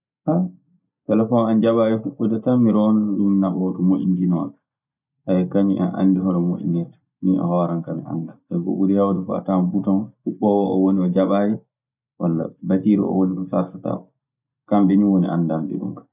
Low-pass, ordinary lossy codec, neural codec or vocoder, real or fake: 3.6 kHz; none; none; real